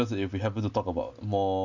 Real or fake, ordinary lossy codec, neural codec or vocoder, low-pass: real; none; none; 7.2 kHz